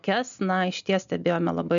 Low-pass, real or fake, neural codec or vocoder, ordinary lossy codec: 7.2 kHz; real; none; MP3, 96 kbps